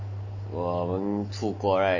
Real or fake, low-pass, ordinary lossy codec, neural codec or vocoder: real; 7.2 kHz; none; none